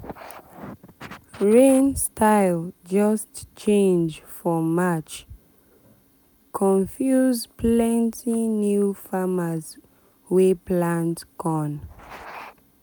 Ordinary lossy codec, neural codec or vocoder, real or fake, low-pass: none; none; real; none